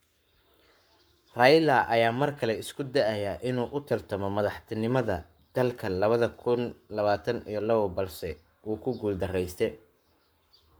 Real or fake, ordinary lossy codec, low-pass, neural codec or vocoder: fake; none; none; codec, 44.1 kHz, 7.8 kbps, Pupu-Codec